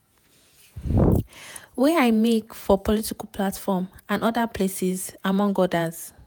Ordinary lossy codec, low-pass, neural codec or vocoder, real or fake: none; none; vocoder, 48 kHz, 128 mel bands, Vocos; fake